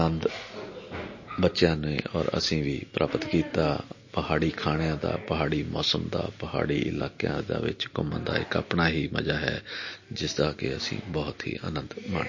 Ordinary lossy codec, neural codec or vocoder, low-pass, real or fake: MP3, 32 kbps; none; 7.2 kHz; real